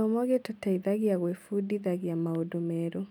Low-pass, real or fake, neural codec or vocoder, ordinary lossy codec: 19.8 kHz; real; none; none